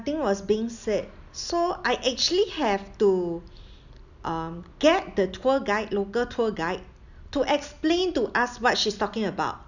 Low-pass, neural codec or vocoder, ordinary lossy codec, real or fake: 7.2 kHz; none; none; real